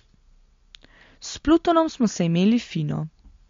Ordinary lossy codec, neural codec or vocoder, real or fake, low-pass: MP3, 48 kbps; none; real; 7.2 kHz